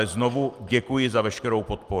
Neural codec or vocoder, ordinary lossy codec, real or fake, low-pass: vocoder, 44.1 kHz, 128 mel bands every 256 samples, BigVGAN v2; Opus, 32 kbps; fake; 14.4 kHz